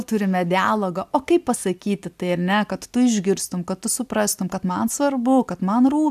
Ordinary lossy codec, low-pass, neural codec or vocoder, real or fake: AAC, 96 kbps; 14.4 kHz; none; real